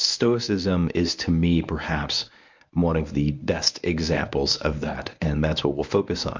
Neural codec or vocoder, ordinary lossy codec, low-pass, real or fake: codec, 24 kHz, 0.9 kbps, WavTokenizer, medium speech release version 1; MP3, 64 kbps; 7.2 kHz; fake